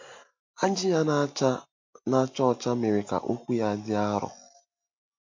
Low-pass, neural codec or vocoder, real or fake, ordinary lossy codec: 7.2 kHz; none; real; MP3, 48 kbps